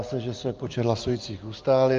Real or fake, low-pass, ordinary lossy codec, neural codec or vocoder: real; 7.2 kHz; Opus, 24 kbps; none